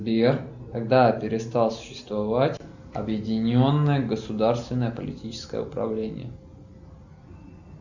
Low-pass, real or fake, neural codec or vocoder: 7.2 kHz; real; none